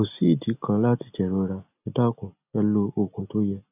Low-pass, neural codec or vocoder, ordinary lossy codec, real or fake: 3.6 kHz; none; none; real